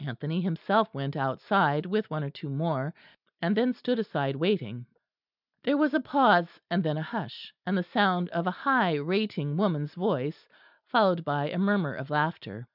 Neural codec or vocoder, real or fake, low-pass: none; real; 5.4 kHz